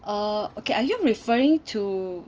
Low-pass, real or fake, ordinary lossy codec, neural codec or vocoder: 7.2 kHz; real; Opus, 24 kbps; none